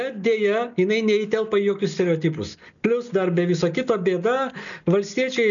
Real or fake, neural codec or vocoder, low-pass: real; none; 7.2 kHz